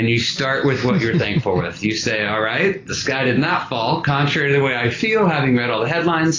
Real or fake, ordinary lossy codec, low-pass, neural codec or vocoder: real; AAC, 32 kbps; 7.2 kHz; none